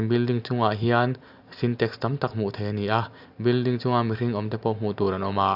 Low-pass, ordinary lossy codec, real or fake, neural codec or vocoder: 5.4 kHz; AAC, 48 kbps; real; none